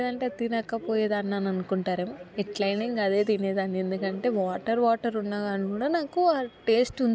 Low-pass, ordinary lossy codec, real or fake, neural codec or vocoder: none; none; real; none